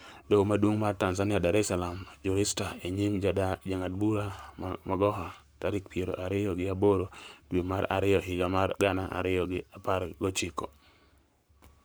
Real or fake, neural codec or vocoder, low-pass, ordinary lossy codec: fake; codec, 44.1 kHz, 7.8 kbps, Pupu-Codec; none; none